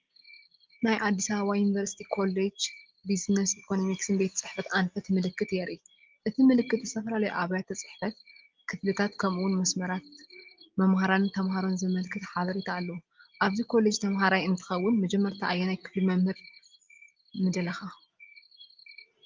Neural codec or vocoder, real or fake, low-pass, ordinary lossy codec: none; real; 7.2 kHz; Opus, 32 kbps